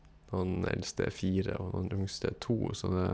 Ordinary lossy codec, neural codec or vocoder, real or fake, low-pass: none; none; real; none